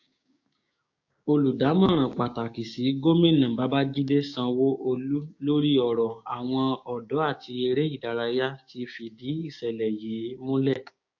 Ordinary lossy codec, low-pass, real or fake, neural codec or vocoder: AAC, 48 kbps; 7.2 kHz; fake; codec, 16 kHz, 6 kbps, DAC